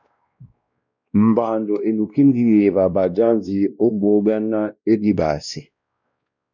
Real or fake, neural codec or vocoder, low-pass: fake; codec, 16 kHz, 1 kbps, X-Codec, WavLM features, trained on Multilingual LibriSpeech; 7.2 kHz